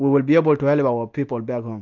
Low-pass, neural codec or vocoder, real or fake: 7.2 kHz; none; real